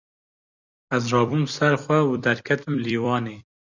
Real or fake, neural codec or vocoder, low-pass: fake; vocoder, 44.1 kHz, 128 mel bands every 256 samples, BigVGAN v2; 7.2 kHz